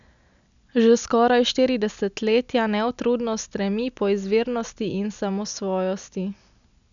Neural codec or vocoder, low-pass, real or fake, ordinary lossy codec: none; 7.2 kHz; real; none